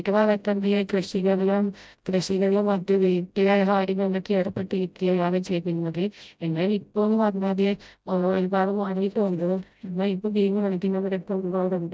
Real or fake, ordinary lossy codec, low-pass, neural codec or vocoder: fake; none; none; codec, 16 kHz, 0.5 kbps, FreqCodec, smaller model